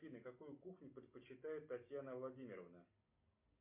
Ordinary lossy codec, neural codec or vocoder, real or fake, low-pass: MP3, 32 kbps; none; real; 3.6 kHz